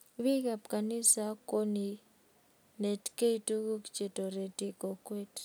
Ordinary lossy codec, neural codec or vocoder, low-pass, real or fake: none; none; none; real